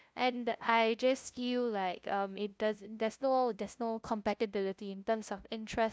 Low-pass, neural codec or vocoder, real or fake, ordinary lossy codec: none; codec, 16 kHz, 0.5 kbps, FunCodec, trained on LibriTTS, 25 frames a second; fake; none